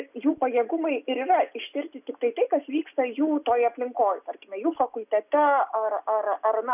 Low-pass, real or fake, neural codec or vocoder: 3.6 kHz; real; none